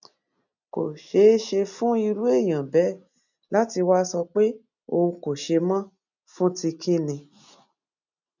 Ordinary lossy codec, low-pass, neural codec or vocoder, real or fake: none; 7.2 kHz; none; real